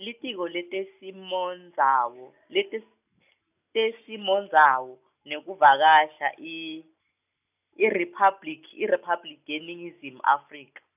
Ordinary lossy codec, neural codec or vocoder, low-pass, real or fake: none; none; 3.6 kHz; real